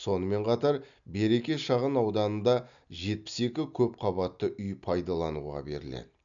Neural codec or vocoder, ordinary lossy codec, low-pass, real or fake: none; none; 7.2 kHz; real